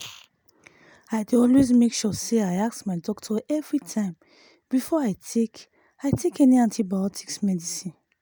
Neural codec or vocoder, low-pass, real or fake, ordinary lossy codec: none; none; real; none